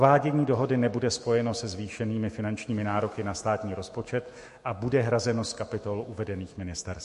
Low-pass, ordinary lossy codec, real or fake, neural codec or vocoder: 14.4 kHz; MP3, 48 kbps; fake; autoencoder, 48 kHz, 128 numbers a frame, DAC-VAE, trained on Japanese speech